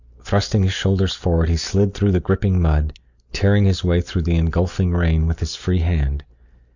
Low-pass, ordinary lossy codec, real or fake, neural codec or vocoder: 7.2 kHz; Opus, 64 kbps; real; none